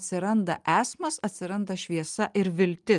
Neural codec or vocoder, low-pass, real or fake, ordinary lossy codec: none; 10.8 kHz; real; Opus, 32 kbps